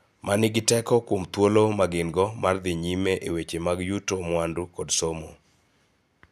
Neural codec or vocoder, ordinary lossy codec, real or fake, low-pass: none; none; real; 14.4 kHz